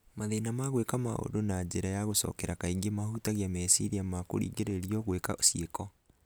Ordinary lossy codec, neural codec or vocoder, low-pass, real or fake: none; none; none; real